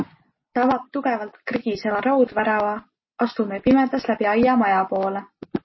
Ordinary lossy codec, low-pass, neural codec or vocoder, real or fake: MP3, 24 kbps; 7.2 kHz; none; real